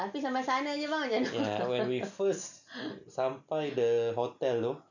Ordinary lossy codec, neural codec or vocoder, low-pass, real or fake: none; none; 7.2 kHz; real